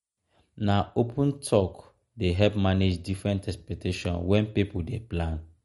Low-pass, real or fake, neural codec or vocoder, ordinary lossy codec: 19.8 kHz; real; none; MP3, 48 kbps